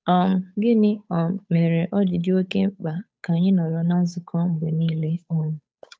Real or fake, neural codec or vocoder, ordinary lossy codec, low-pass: fake; codec, 16 kHz, 8 kbps, FunCodec, trained on Chinese and English, 25 frames a second; none; none